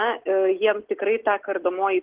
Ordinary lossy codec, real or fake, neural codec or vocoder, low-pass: Opus, 16 kbps; real; none; 3.6 kHz